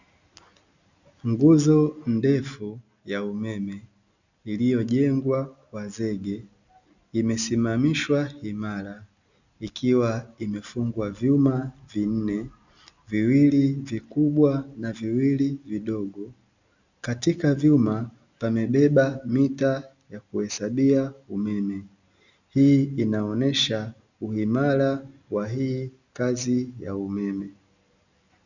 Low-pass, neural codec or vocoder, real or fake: 7.2 kHz; none; real